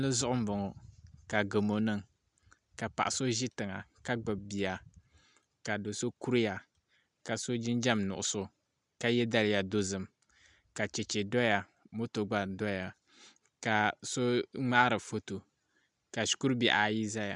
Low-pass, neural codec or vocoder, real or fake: 10.8 kHz; none; real